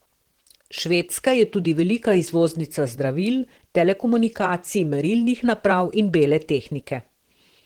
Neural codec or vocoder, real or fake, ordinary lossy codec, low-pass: vocoder, 44.1 kHz, 128 mel bands, Pupu-Vocoder; fake; Opus, 16 kbps; 19.8 kHz